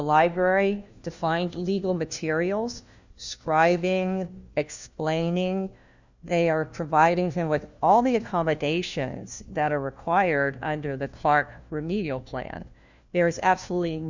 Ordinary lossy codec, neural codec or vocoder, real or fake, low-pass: Opus, 64 kbps; codec, 16 kHz, 1 kbps, FunCodec, trained on LibriTTS, 50 frames a second; fake; 7.2 kHz